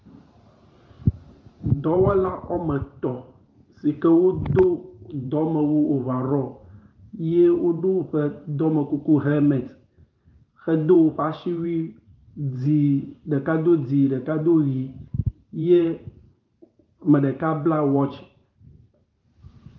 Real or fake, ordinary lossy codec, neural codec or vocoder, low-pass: fake; Opus, 32 kbps; vocoder, 44.1 kHz, 128 mel bands every 512 samples, BigVGAN v2; 7.2 kHz